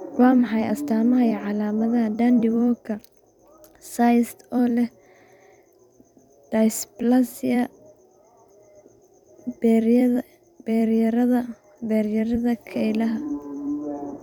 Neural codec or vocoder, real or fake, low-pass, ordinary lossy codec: vocoder, 44.1 kHz, 128 mel bands every 256 samples, BigVGAN v2; fake; 19.8 kHz; none